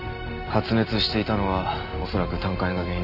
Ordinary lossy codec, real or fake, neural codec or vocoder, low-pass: none; real; none; 5.4 kHz